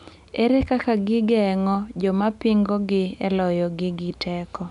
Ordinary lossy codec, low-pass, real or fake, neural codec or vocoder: none; 10.8 kHz; real; none